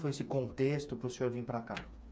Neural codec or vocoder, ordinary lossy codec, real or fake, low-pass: codec, 16 kHz, 4 kbps, FreqCodec, smaller model; none; fake; none